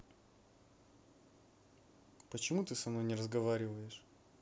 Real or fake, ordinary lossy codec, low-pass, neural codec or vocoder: real; none; none; none